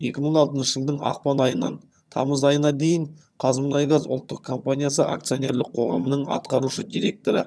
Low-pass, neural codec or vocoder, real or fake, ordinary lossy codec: none; vocoder, 22.05 kHz, 80 mel bands, HiFi-GAN; fake; none